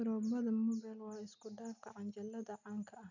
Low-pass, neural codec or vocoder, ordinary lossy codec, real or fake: 7.2 kHz; none; none; real